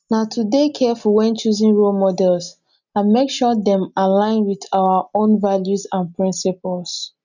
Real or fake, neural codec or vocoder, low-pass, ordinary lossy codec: real; none; 7.2 kHz; none